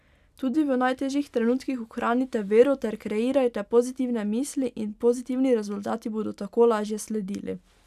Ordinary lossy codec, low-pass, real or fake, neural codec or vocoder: none; 14.4 kHz; real; none